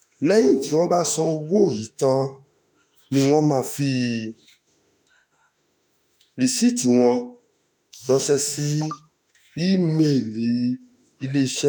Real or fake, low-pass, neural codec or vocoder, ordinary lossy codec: fake; none; autoencoder, 48 kHz, 32 numbers a frame, DAC-VAE, trained on Japanese speech; none